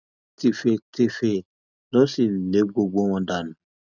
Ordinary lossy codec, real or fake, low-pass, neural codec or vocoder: none; real; 7.2 kHz; none